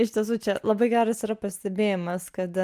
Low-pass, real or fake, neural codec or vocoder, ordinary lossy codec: 14.4 kHz; real; none; Opus, 32 kbps